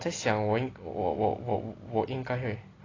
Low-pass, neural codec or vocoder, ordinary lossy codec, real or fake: 7.2 kHz; none; AAC, 32 kbps; real